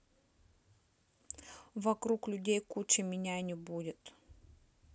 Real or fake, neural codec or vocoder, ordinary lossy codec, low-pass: real; none; none; none